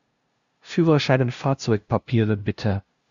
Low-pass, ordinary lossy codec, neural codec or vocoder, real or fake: 7.2 kHz; Opus, 64 kbps; codec, 16 kHz, 0.5 kbps, FunCodec, trained on LibriTTS, 25 frames a second; fake